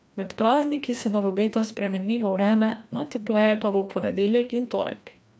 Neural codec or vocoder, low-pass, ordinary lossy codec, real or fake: codec, 16 kHz, 1 kbps, FreqCodec, larger model; none; none; fake